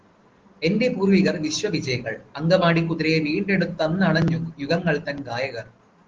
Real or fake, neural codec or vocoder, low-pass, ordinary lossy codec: real; none; 7.2 kHz; Opus, 16 kbps